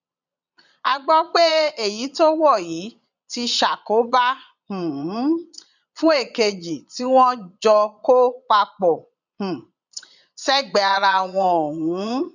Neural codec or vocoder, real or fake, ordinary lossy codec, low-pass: vocoder, 22.05 kHz, 80 mel bands, Vocos; fake; none; 7.2 kHz